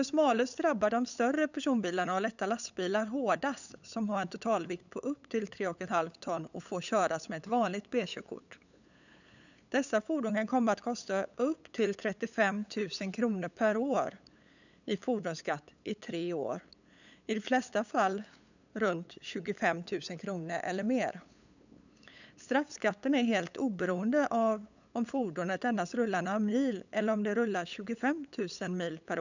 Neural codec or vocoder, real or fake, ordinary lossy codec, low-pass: codec, 16 kHz, 8 kbps, FunCodec, trained on LibriTTS, 25 frames a second; fake; none; 7.2 kHz